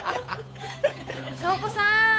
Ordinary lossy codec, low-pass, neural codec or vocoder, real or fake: none; none; codec, 16 kHz, 8 kbps, FunCodec, trained on Chinese and English, 25 frames a second; fake